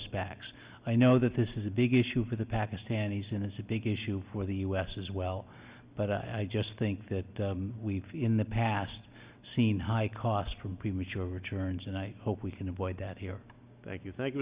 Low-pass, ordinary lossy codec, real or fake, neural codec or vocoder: 3.6 kHz; Opus, 64 kbps; real; none